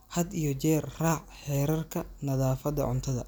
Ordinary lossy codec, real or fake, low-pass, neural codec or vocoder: none; real; none; none